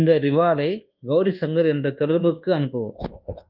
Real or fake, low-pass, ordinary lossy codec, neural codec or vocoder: fake; 5.4 kHz; Opus, 32 kbps; codec, 24 kHz, 1.2 kbps, DualCodec